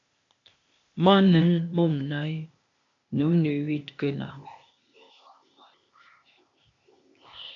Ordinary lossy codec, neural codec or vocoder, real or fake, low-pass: MP3, 64 kbps; codec, 16 kHz, 0.8 kbps, ZipCodec; fake; 7.2 kHz